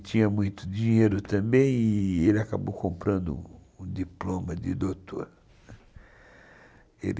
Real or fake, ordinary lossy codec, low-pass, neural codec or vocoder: real; none; none; none